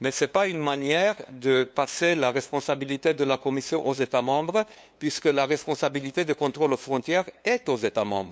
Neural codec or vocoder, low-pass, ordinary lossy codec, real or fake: codec, 16 kHz, 2 kbps, FunCodec, trained on LibriTTS, 25 frames a second; none; none; fake